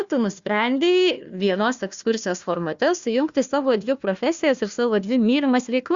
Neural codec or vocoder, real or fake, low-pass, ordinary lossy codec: codec, 16 kHz, 1 kbps, FunCodec, trained on Chinese and English, 50 frames a second; fake; 7.2 kHz; Opus, 64 kbps